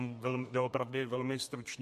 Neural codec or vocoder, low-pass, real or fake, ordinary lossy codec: codec, 32 kHz, 1.9 kbps, SNAC; 14.4 kHz; fake; MP3, 64 kbps